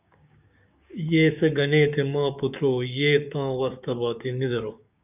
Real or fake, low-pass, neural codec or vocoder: fake; 3.6 kHz; codec, 16 kHz, 6 kbps, DAC